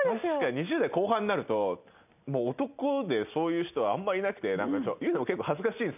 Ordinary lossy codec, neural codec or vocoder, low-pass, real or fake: none; none; 3.6 kHz; real